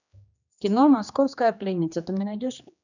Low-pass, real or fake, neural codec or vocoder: 7.2 kHz; fake; codec, 16 kHz, 2 kbps, X-Codec, HuBERT features, trained on general audio